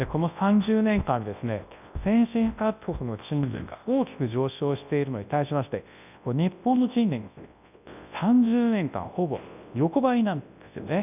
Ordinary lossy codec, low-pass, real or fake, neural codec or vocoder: none; 3.6 kHz; fake; codec, 24 kHz, 0.9 kbps, WavTokenizer, large speech release